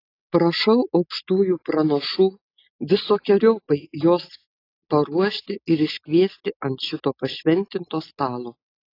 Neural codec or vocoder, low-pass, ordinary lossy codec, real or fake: none; 5.4 kHz; AAC, 32 kbps; real